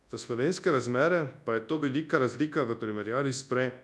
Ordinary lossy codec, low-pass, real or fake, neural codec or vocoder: none; none; fake; codec, 24 kHz, 0.9 kbps, WavTokenizer, large speech release